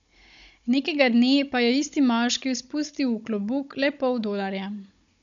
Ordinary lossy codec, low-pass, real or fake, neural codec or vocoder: MP3, 96 kbps; 7.2 kHz; fake; codec, 16 kHz, 16 kbps, FunCodec, trained on Chinese and English, 50 frames a second